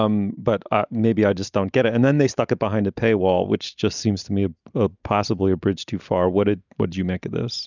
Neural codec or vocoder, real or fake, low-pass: none; real; 7.2 kHz